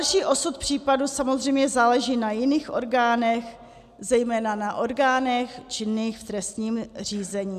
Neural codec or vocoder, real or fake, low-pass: none; real; 14.4 kHz